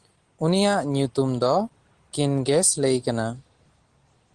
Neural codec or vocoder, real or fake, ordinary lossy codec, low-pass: none; real; Opus, 16 kbps; 9.9 kHz